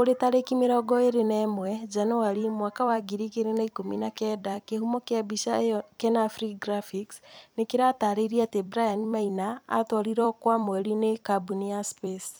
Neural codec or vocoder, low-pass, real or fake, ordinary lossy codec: vocoder, 44.1 kHz, 128 mel bands every 256 samples, BigVGAN v2; none; fake; none